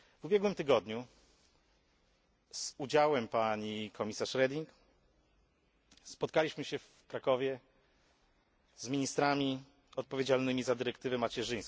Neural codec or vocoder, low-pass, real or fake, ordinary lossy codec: none; none; real; none